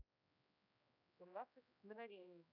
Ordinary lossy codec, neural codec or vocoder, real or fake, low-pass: none; codec, 16 kHz, 1 kbps, X-Codec, HuBERT features, trained on general audio; fake; 5.4 kHz